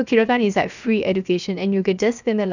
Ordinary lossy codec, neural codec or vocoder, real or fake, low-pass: none; codec, 16 kHz, 0.7 kbps, FocalCodec; fake; 7.2 kHz